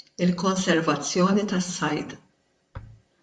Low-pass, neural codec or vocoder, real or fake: 10.8 kHz; vocoder, 44.1 kHz, 128 mel bands, Pupu-Vocoder; fake